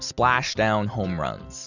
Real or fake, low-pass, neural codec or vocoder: real; 7.2 kHz; none